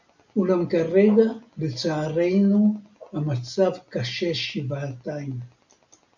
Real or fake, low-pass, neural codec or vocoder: real; 7.2 kHz; none